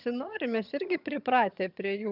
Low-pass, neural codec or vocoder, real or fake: 5.4 kHz; vocoder, 22.05 kHz, 80 mel bands, HiFi-GAN; fake